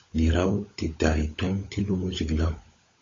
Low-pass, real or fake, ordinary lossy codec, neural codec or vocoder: 7.2 kHz; fake; AAC, 32 kbps; codec, 16 kHz, 16 kbps, FunCodec, trained on LibriTTS, 50 frames a second